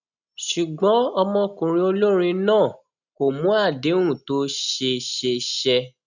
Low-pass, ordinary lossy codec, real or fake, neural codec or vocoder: 7.2 kHz; none; real; none